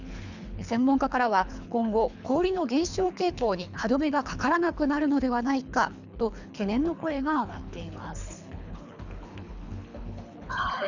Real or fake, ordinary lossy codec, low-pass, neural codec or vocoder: fake; none; 7.2 kHz; codec, 24 kHz, 3 kbps, HILCodec